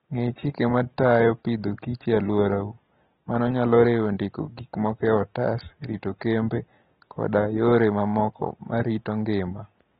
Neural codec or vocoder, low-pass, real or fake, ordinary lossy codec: none; 19.8 kHz; real; AAC, 16 kbps